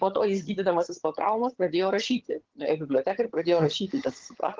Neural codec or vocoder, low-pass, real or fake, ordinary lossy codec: vocoder, 22.05 kHz, 80 mel bands, HiFi-GAN; 7.2 kHz; fake; Opus, 16 kbps